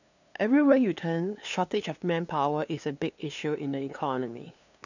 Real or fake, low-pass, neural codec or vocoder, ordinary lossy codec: fake; 7.2 kHz; codec, 16 kHz, 2 kbps, FunCodec, trained on LibriTTS, 25 frames a second; none